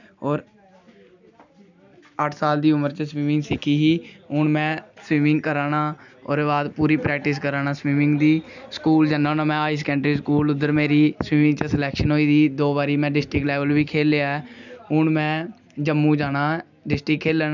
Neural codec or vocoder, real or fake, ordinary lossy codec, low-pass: none; real; none; 7.2 kHz